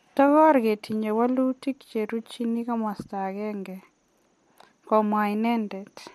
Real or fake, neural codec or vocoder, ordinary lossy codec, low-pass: real; none; MP3, 64 kbps; 14.4 kHz